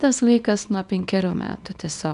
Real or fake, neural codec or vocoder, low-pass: fake; codec, 24 kHz, 0.9 kbps, WavTokenizer, small release; 10.8 kHz